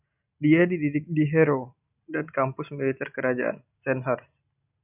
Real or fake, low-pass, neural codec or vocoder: real; 3.6 kHz; none